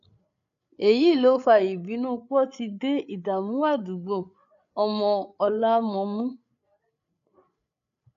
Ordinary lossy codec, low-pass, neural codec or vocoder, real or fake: none; 7.2 kHz; codec, 16 kHz, 8 kbps, FreqCodec, larger model; fake